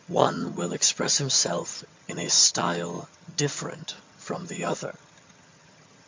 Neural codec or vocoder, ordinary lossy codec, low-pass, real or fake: vocoder, 22.05 kHz, 80 mel bands, HiFi-GAN; MP3, 48 kbps; 7.2 kHz; fake